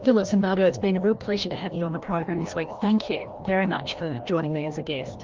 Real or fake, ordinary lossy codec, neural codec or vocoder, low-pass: fake; Opus, 32 kbps; codec, 16 kHz, 1 kbps, FreqCodec, larger model; 7.2 kHz